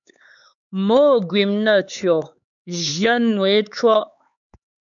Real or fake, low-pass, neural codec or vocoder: fake; 7.2 kHz; codec, 16 kHz, 4 kbps, X-Codec, HuBERT features, trained on LibriSpeech